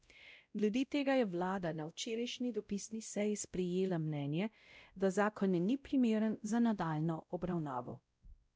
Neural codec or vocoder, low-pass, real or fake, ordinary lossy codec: codec, 16 kHz, 0.5 kbps, X-Codec, WavLM features, trained on Multilingual LibriSpeech; none; fake; none